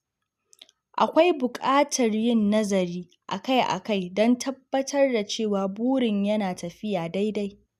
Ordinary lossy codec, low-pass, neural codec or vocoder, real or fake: none; 14.4 kHz; none; real